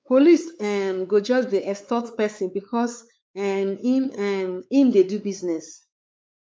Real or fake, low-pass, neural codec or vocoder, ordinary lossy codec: fake; none; codec, 16 kHz, 4 kbps, X-Codec, WavLM features, trained on Multilingual LibriSpeech; none